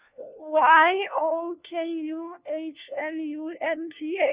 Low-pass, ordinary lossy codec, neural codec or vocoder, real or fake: 3.6 kHz; Opus, 64 kbps; codec, 16 kHz, 1 kbps, FunCodec, trained on LibriTTS, 50 frames a second; fake